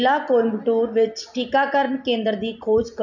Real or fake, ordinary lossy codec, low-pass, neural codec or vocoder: real; none; 7.2 kHz; none